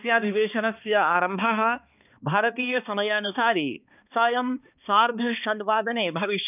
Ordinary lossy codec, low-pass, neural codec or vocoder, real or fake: none; 3.6 kHz; codec, 16 kHz, 2 kbps, X-Codec, HuBERT features, trained on balanced general audio; fake